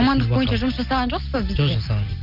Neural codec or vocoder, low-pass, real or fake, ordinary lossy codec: none; 5.4 kHz; real; Opus, 32 kbps